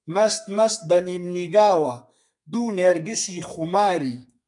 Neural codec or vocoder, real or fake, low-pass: codec, 32 kHz, 1.9 kbps, SNAC; fake; 10.8 kHz